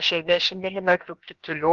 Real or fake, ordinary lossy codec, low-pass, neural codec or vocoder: fake; Opus, 24 kbps; 7.2 kHz; codec, 16 kHz, about 1 kbps, DyCAST, with the encoder's durations